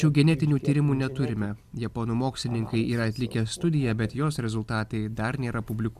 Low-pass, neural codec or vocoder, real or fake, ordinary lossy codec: 14.4 kHz; none; real; AAC, 96 kbps